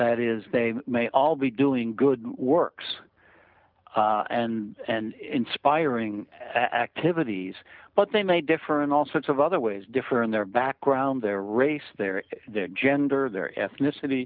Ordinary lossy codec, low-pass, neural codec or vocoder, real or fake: Opus, 24 kbps; 5.4 kHz; none; real